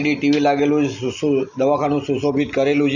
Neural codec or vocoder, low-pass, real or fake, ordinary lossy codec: none; 7.2 kHz; real; none